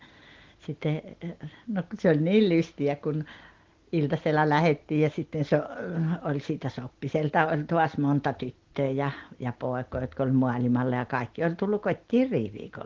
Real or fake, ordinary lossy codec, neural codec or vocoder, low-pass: real; Opus, 16 kbps; none; 7.2 kHz